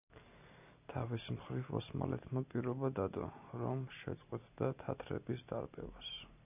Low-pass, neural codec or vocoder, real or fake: 3.6 kHz; none; real